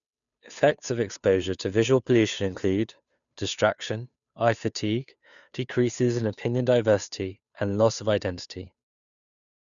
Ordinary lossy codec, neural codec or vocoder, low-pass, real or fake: none; codec, 16 kHz, 2 kbps, FunCodec, trained on Chinese and English, 25 frames a second; 7.2 kHz; fake